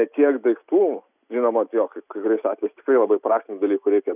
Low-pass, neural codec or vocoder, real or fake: 3.6 kHz; none; real